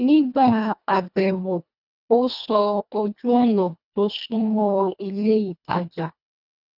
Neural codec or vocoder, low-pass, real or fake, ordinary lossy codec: codec, 24 kHz, 1.5 kbps, HILCodec; 5.4 kHz; fake; none